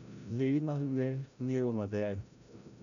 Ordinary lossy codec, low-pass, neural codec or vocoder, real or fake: none; 7.2 kHz; codec, 16 kHz, 0.5 kbps, FreqCodec, larger model; fake